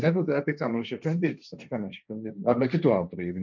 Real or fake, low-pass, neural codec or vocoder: fake; 7.2 kHz; codec, 16 kHz, 1.1 kbps, Voila-Tokenizer